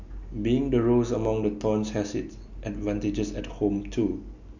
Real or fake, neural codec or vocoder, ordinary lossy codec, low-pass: real; none; none; 7.2 kHz